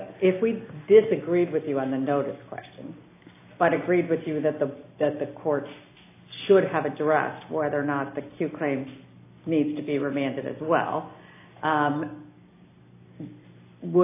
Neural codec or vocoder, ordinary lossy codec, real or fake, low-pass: none; AAC, 24 kbps; real; 3.6 kHz